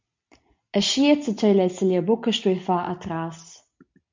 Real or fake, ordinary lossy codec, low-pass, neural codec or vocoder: real; MP3, 48 kbps; 7.2 kHz; none